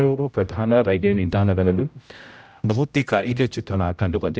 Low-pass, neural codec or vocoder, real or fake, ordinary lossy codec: none; codec, 16 kHz, 0.5 kbps, X-Codec, HuBERT features, trained on general audio; fake; none